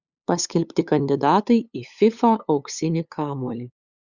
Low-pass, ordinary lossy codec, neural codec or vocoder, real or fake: 7.2 kHz; Opus, 64 kbps; codec, 16 kHz, 8 kbps, FunCodec, trained on LibriTTS, 25 frames a second; fake